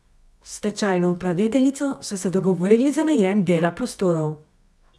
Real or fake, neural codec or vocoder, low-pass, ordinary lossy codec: fake; codec, 24 kHz, 0.9 kbps, WavTokenizer, medium music audio release; none; none